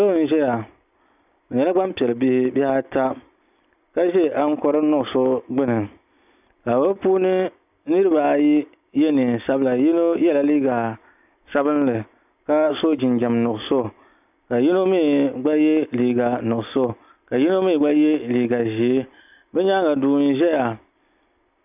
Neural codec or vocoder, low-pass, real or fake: none; 3.6 kHz; real